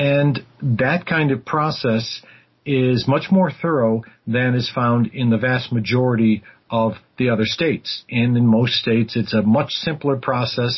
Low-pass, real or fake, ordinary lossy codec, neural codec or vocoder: 7.2 kHz; real; MP3, 24 kbps; none